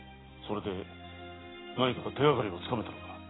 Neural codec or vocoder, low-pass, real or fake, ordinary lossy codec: none; 7.2 kHz; real; AAC, 16 kbps